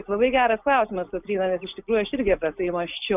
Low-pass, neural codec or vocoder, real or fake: 3.6 kHz; none; real